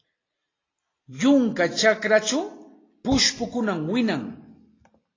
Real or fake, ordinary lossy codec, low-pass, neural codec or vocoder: real; AAC, 32 kbps; 7.2 kHz; none